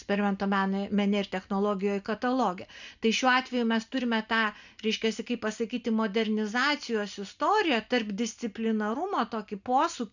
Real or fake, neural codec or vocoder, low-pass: real; none; 7.2 kHz